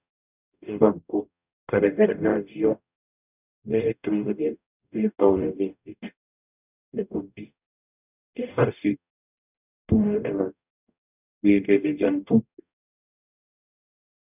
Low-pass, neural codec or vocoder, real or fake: 3.6 kHz; codec, 44.1 kHz, 0.9 kbps, DAC; fake